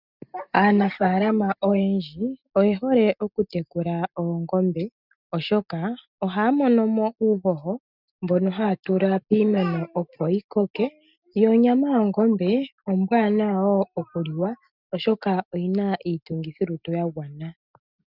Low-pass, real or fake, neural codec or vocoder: 5.4 kHz; real; none